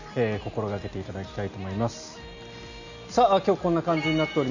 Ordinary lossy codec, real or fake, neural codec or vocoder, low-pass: none; real; none; 7.2 kHz